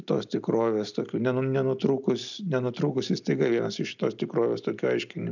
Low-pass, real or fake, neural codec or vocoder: 7.2 kHz; real; none